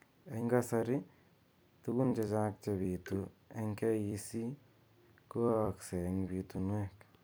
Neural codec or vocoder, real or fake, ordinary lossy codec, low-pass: vocoder, 44.1 kHz, 128 mel bands every 256 samples, BigVGAN v2; fake; none; none